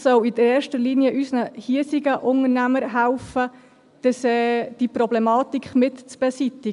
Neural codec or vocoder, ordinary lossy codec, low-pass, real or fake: none; none; 10.8 kHz; real